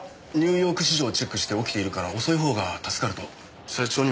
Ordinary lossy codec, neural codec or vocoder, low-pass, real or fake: none; none; none; real